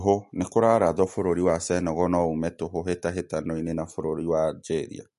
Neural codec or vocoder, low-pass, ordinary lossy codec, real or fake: none; 14.4 kHz; MP3, 48 kbps; real